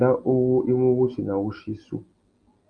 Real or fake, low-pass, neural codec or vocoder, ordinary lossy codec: real; 9.9 kHz; none; Opus, 32 kbps